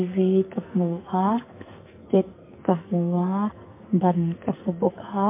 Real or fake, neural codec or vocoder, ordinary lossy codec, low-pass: fake; codec, 44.1 kHz, 2.6 kbps, SNAC; MP3, 24 kbps; 3.6 kHz